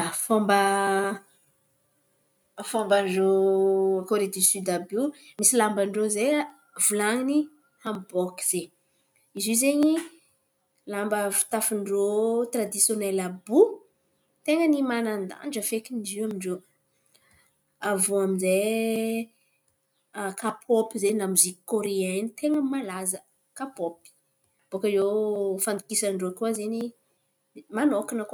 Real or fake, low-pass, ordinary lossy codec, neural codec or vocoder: real; none; none; none